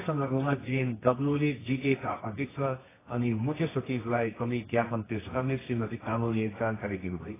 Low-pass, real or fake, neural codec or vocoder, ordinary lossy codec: 3.6 kHz; fake; codec, 24 kHz, 0.9 kbps, WavTokenizer, medium music audio release; AAC, 16 kbps